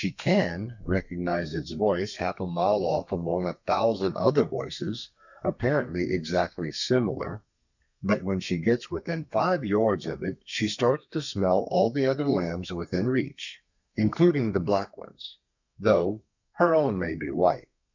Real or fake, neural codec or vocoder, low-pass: fake; codec, 32 kHz, 1.9 kbps, SNAC; 7.2 kHz